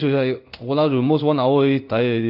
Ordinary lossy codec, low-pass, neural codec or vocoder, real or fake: none; 5.4 kHz; codec, 16 kHz in and 24 kHz out, 1 kbps, XY-Tokenizer; fake